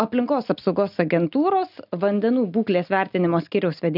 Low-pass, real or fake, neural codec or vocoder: 5.4 kHz; real; none